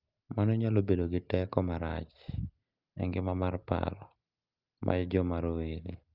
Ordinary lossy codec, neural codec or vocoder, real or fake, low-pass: Opus, 24 kbps; none; real; 5.4 kHz